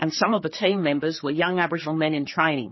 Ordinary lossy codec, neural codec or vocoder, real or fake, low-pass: MP3, 24 kbps; codec, 16 kHz, 4 kbps, X-Codec, HuBERT features, trained on general audio; fake; 7.2 kHz